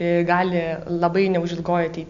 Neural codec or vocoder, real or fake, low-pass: none; real; 7.2 kHz